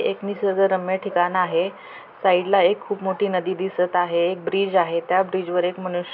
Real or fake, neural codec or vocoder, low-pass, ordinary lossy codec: real; none; 5.4 kHz; none